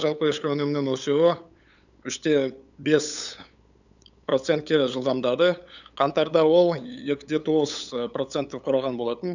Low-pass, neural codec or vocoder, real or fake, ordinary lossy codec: 7.2 kHz; codec, 16 kHz, 8 kbps, FunCodec, trained on LibriTTS, 25 frames a second; fake; none